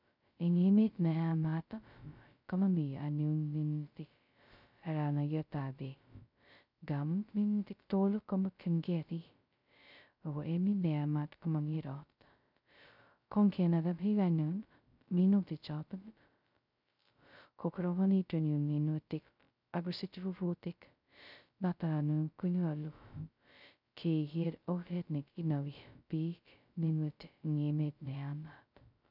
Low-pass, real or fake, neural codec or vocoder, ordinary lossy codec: 5.4 kHz; fake; codec, 16 kHz, 0.2 kbps, FocalCodec; none